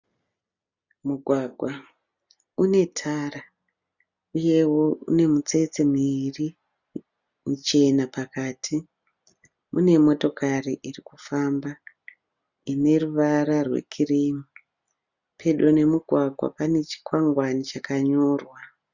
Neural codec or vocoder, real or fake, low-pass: none; real; 7.2 kHz